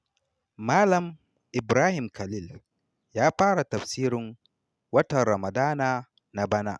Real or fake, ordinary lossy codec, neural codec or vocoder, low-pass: real; none; none; none